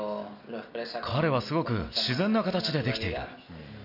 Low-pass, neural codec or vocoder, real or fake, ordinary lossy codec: 5.4 kHz; none; real; none